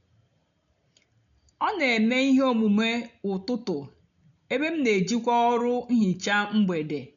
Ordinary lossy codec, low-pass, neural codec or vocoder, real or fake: none; 7.2 kHz; none; real